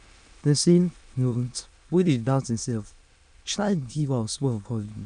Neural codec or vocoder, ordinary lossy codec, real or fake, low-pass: autoencoder, 22.05 kHz, a latent of 192 numbers a frame, VITS, trained on many speakers; none; fake; 9.9 kHz